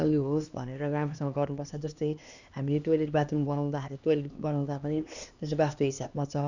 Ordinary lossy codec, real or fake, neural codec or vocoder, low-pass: none; fake; codec, 16 kHz, 2 kbps, X-Codec, HuBERT features, trained on LibriSpeech; 7.2 kHz